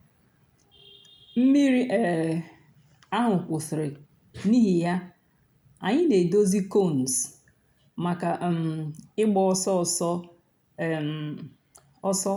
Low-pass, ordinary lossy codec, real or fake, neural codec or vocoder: 19.8 kHz; none; real; none